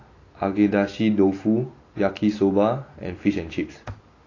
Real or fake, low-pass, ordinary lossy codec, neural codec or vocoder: real; 7.2 kHz; AAC, 32 kbps; none